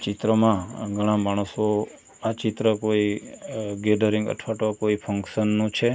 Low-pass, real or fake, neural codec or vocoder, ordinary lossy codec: none; real; none; none